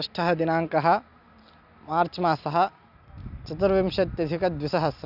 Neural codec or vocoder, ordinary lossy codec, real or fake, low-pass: none; none; real; 5.4 kHz